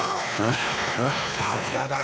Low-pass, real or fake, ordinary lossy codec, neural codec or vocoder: none; fake; none; codec, 16 kHz, 2 kbps, X-Codec, WavLM features, trained on Multilingual LibriSpeech